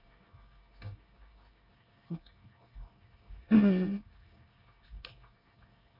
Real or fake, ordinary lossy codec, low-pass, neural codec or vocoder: fake; none; 5.4 kHz; codec, 24 kHz, 1 kbps, SNAC